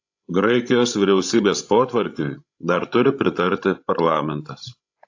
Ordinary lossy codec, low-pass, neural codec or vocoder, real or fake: AAC, 48 kbps; 7.2 kHz; codec, 16 kHz, 16 kbps, FreqCodec, larger model; fake